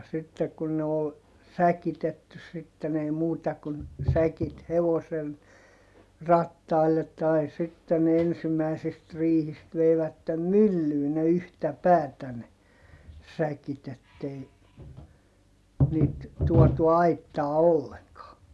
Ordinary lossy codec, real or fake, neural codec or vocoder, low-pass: none; real; none; none